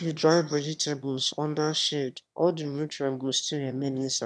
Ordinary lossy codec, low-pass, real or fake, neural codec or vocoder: none; none; fake; autoencoder, 22.05 kHz, a latent of 192 numbers a frame, VITS, trained on one speaker